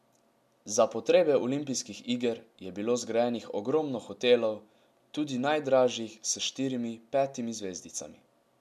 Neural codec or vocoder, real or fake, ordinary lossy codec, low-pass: none; real; MP3, 96 kbps; 14.4 kHz